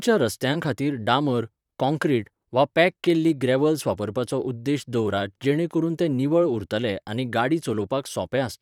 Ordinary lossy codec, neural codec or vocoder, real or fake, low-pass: none; vocoder, 44.1 kHz, 128 mel bands, Pupu-Vocoder; fake; 19.8 kHz